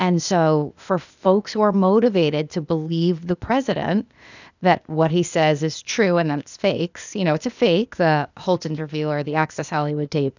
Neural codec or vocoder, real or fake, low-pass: codec, 16 kHz, 0.8 kbps, ZipCodec; fake; 7.2 kHz